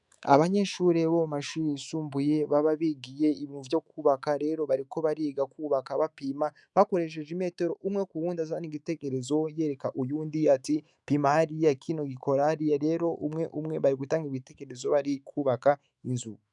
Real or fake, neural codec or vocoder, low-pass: fake; autoencoder, 48 kHz, 128 numbers a frame, DAC-VAE, trained on Japanese speech; 10.8 kHz